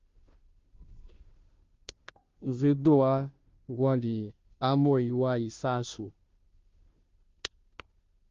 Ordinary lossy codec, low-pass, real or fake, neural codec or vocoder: Opus, 32 kbps; 7.2 kHz; fake; codec, 16 kHz, 0.5 kbps, FunCodec, trained on Chinese and English, 25 frames a second